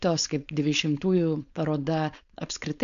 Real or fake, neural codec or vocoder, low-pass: fake; codec, 16 kHz, 4.8 kbps, FACodec; 7.2 kHz